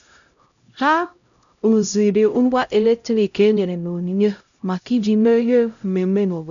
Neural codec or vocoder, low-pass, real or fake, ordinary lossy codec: codec, 16 kHz, 0.5 kbps, X-Codec, HuBERT features, trained on LibriSpeech; 7.2 kHz; fake; none